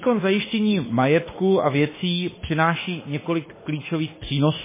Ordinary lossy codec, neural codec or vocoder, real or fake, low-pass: MP3, 16 kbps; autoencoder, 48 kHz, 32 numbers a frame, DAC-VAE, trained on Japanese speech; fake; 3.6 kHz